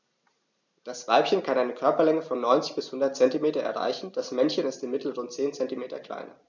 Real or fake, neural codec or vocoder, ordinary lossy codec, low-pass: real; none; none; 7.2 kHz